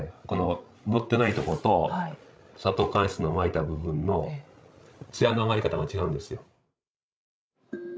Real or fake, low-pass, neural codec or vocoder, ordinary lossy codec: fake; none; codec, 16 kHz, 16 kbps, FreqCodec, larger model; none